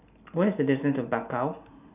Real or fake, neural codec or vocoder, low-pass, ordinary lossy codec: real; none; 3.6 kHz; none